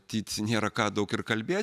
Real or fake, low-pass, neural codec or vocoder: real; 14.4 kHz; none